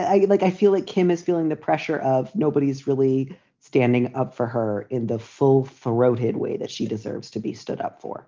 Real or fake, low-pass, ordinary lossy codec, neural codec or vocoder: real; 7.2 kHz; Opus, 24 kbps; none